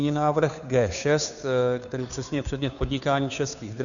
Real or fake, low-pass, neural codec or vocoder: fake; 7.2 kHz; codec, 16 kHz, 2 kbps, FunCodec, trained on Chinese and English, 25 frames a second